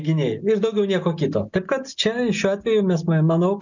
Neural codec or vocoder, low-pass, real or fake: none; 7.2 kHz; real